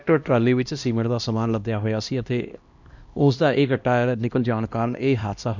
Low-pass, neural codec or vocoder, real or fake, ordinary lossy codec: 7.2 kHz; codec, 16 kHz, 1 kbps, X-Codec, HuBERT features, trained on LibriSpeech; fake; MP3, 64 kbps